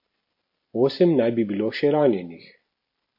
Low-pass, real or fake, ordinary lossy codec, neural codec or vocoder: 5.4 kHz; real; MP3, 32 kbps; none